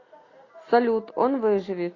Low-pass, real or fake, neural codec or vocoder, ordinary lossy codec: 7.2 kHz; real; none; AAC, 32 kbps